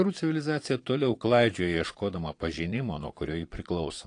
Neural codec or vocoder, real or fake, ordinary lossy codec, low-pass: vocoder, 22.05 kHz, 80 mel bands, Vocos; fake; AAC, 48 kbps; 9.9 kHz